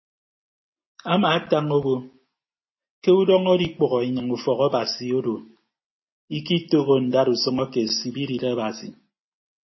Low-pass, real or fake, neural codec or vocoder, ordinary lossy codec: 7.2 kHz; real; none; MP3, 24 kbps